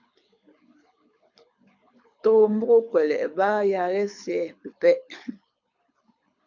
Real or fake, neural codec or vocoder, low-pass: fake; codec, 24 kHz, 6 kbps, HILCodec; 7.2 kHz